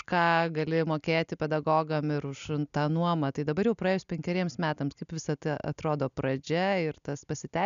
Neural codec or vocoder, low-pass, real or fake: none; 7.2 kHz; real